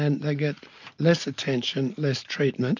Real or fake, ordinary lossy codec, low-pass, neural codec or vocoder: real; MP3, 48 kbps; 7.2 kHz; none